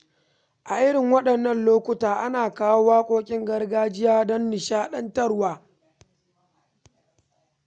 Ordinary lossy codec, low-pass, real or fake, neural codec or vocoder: none; 9.9 kHz; real; none